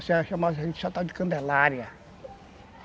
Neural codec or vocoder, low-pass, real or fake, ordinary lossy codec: none; none; real; none